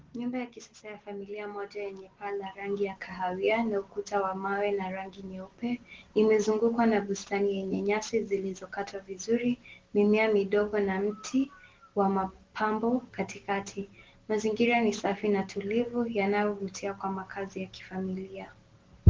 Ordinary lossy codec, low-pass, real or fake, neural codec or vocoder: Opus, 16 kbps; 7.2 kHz; real; none